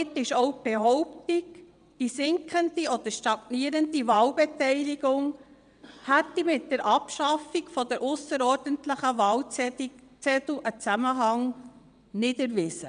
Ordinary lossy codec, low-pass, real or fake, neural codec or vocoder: none; 9.9 kHz; fake; vocoder, 22.05 kHz, 80 mel bands, WaveNeXt